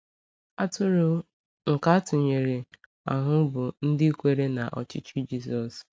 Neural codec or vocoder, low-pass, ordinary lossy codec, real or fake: none; none; none; real